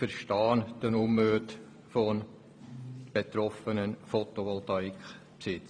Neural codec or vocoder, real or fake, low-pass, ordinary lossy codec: vocoder, 44.1 kHz, 128 mel bands every 512 samples, BigVGAN v2; fake; 9.9 kHz; none